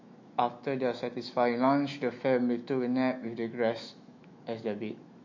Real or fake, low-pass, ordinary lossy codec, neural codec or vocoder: fake; 7.2 kHz; MP3, 48 kbps; autoencoder, 48 kHz, 128 numbers a frame, DAC-VAE, trained on Japanese speech